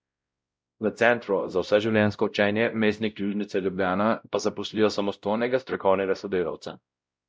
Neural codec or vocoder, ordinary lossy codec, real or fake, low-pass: codec, 16 kHz, 0.5 kbps, X-Codec, WavLM features, trained on Multilingual LibriSpeech; none; fake; none